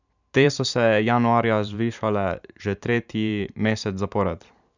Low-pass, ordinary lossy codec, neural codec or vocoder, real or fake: 7.2 kHz; none; vocoder, 44.1 kHz, 128 mel bands every 512 samples, BigVGAN v2; fake